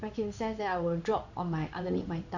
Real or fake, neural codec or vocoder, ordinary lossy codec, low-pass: fake; codec, 16 kHz in and 24 kHz out, 1 kbps, XY-Tokenizer; none; 7.2 kHz